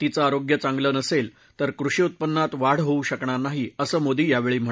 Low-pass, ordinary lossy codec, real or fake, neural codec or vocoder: none; none; real; none